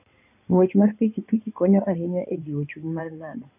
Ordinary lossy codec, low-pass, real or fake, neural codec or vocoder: none; 3.6 kHz; fake; codec, 16 kHz in and 24 kHz out, 2.2 kbps, FireRedTTS-2 codec